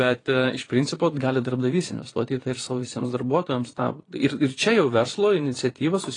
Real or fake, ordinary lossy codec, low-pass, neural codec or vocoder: fake; AAC, 32 kbps; 9.9 kHz; vocoder, 22.05 kHz, 80 mel bands, Vocos